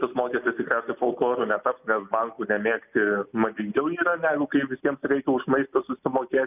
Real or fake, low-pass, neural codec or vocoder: real; 3.6 kHz; none